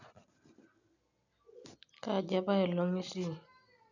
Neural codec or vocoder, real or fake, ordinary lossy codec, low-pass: none; real; none; 7.2 kHz